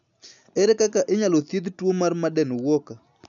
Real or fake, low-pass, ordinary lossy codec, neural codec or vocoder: real; 7.2 kHz; none; none